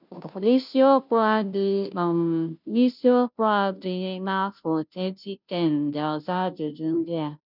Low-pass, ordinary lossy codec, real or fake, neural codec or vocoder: 5.4 kHz; none; fake; codec, 16 kHz, 0.5 kbps, FunCodec, trained on Chinese and English, 25 frames a second